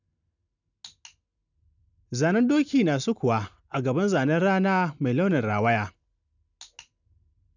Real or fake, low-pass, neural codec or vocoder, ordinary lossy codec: real; 7.2 kHz; none; none